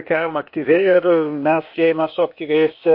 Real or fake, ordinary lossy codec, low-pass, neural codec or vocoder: fake; MP3, 32 kbps; 5.4 kHz; codec, 16 kHz, 0.8 kbps, ZipCodec